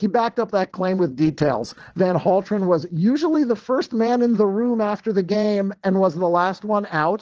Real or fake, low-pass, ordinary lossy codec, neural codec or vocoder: fake; 7.2 kHz; Opus, 16 kbps; vocoder, 22.05 kHz, 80 mel bands, WaveNeXt